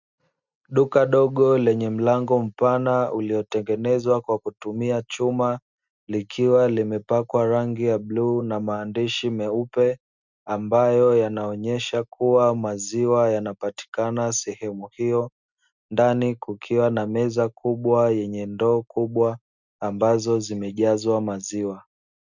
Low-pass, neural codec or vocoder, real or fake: 7.2 kHz; none; real